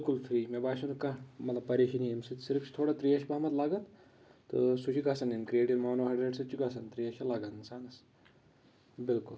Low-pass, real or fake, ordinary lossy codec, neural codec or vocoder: none; real; none; none